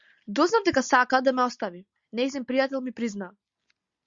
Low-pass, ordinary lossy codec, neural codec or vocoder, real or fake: 7.2 kHz; Opus, 64 kbps; none; real